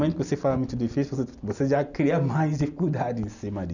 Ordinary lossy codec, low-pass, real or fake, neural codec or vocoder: none; 7.2 kHz; real; none